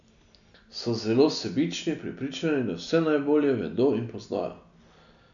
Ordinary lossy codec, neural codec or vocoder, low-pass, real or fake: none; none; 7.2 kHz; real